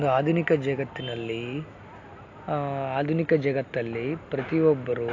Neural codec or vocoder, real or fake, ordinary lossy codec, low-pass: none; real; AAC, 48 kbps; 7.2 kHz